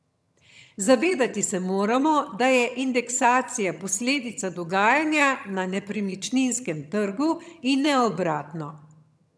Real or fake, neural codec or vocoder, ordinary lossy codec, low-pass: fake; vocoder, 22.05 kHz, 80 mel bands, HiFi-GAN; none; none